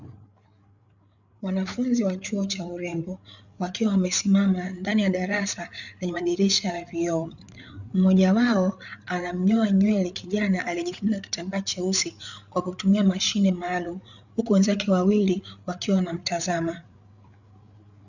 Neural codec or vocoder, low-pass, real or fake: codec, 16 kHz, 8 kbps, FreqCodec, larger model; 7.2 kHz; fake